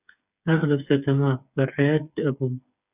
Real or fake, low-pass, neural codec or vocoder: fake; 3.6 kHz; codec, 16 kHz, 8 kbps, FreqCodec, smaller model